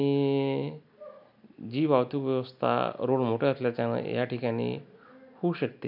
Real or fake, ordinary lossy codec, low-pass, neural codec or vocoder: real; none; 5.4 kHz; none